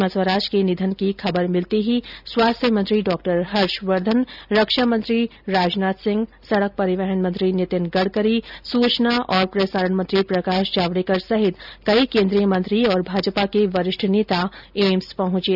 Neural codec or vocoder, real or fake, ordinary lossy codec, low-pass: none; real; none; 5.4 kHz